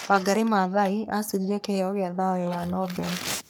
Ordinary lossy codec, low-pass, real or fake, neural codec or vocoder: none; none; fake; codec, 44.1 kHz, 3.4 kbps, Pupu-Codec